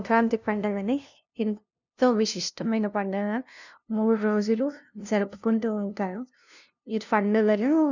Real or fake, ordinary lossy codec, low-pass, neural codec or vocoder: fake; none; 7.2 kHz; codec, 16 kHz, 0.5 kbps, FunCodec, trained on LibriTTS, 25 frames a second